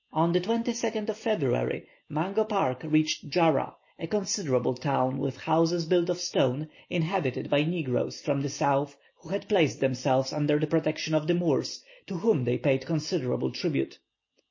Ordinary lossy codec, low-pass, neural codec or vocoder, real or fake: MP3, 32 kbps; 7.2 kHz; none; real